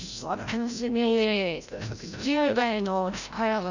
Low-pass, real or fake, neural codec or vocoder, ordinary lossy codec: 7.2 kHz; fake; codec, 16 kHz, 0.5 kbps, FreqCodec, larger model; none